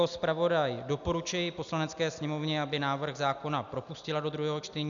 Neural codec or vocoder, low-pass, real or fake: none; 7.2 kHz; real